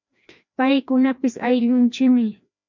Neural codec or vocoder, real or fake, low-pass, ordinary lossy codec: codec, 16 kHz, 1 kbps, FreqCodec, larger model; fake; 7.2 kHz; MP3, 64 kbps